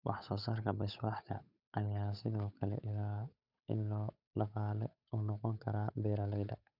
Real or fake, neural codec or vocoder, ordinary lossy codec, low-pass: fake; codec, 16 kHz, 8 kbps, FunCodec, trained on Chinese and English, 25 frames a second; none; 5.4 kHz